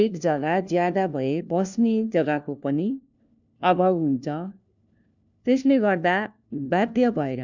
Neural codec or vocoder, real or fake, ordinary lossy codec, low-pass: codec, 16 kHz, 1 kbps, FunCodec, trained on LibriTTS, 50 frames a second; fake; none; 7.2 kHz